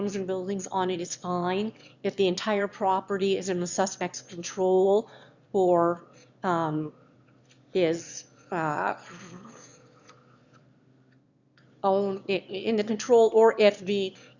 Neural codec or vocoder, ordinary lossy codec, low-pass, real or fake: autoencoder, 22.05 kHz, a latent of 192 numbers a frame, VITS, trained on one speaker; Opus, 64 kbps; 7.2 kHz; fake